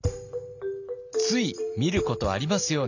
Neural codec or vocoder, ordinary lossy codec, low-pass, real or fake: none; none; 7.2 kHz; real